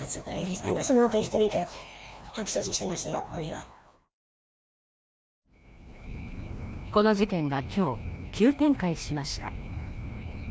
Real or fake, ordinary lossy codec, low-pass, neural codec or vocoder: fake; none; none; codec, 16 kHz, 1 kbps, FreqCodec, larger model